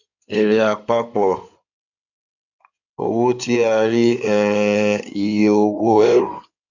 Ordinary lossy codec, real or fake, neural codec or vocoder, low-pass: none; fake; codec, 16 kHz in and 24 kHz out, 2.2 kbps, FireRedTTS-2 codec; 7.2 kHz